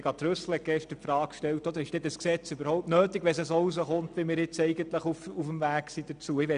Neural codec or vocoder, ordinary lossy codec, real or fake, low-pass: none; none; real; 9.9 kHz